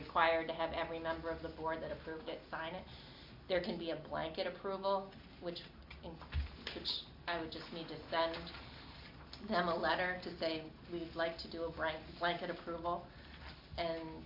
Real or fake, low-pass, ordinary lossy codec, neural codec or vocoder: real; 5.4 kHz; MP3, 48 kbps; none